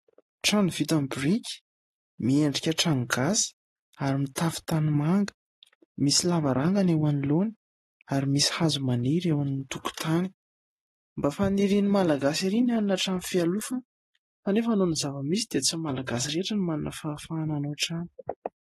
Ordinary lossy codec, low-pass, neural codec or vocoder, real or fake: AAC, 32 kbps; 19.8 kHz; none; real